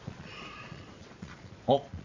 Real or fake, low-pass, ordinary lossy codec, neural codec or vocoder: fake; 7.2 kHz; AAC, 48 kbps; vocoder, 22.05 kHz, 80 mel bands, Vocos